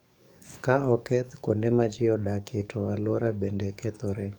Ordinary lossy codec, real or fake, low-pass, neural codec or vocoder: none; fake; 19.8 kHz; codec, 44.1 kHz, 7.8 kbps, DAC